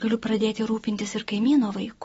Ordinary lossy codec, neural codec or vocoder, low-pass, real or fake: AAC, 24 kbps; none; 19.8 kHz; real